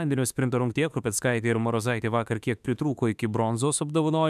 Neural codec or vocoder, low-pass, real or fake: autoencoder, 48 kHz, 32 numbers a frame, DAC-VAE, trained on Japanese speech; 14.4 kHz; fake